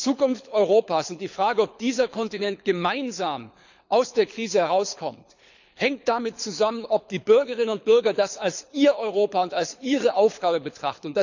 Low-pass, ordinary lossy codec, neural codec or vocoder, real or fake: 7.2 kHz; none; codec, 24 kHz, 6 kbps, HILCodec; fake